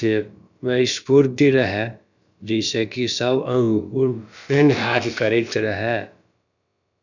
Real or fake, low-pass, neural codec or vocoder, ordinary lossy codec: fake; 7.2 kHz; codec, 16 kHz, about 1 kbps, DyCAST, with the encoder's durations; none